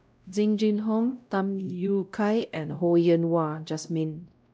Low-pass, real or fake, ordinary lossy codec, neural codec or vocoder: none; fake; none; codec, 16 kHz, 0.5 kbps, X-Codec, WavLM features, trained on Multilingual LibriSpeech